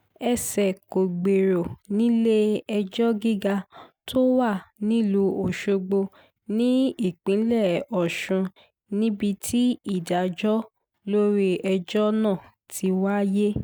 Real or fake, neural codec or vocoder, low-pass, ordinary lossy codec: real; none; none; none